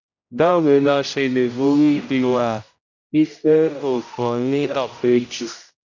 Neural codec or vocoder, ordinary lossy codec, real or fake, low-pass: codec, 16 kHz, 0.5 kbps, X-Codec, HuBERT features, trained on general audio; none; fake; 7.2 kHz